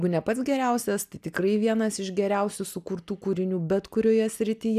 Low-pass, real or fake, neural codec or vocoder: 14.4 kHz; real; none